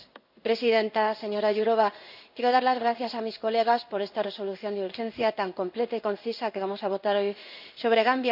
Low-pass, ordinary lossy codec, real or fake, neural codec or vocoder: 5.4 kHz; none; fake; codec, 16 kHz in and 24 kHz out, 1 kbps, XY-Tokenizer